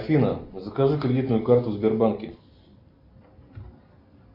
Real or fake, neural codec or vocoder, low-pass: real; none; 5.4 kHz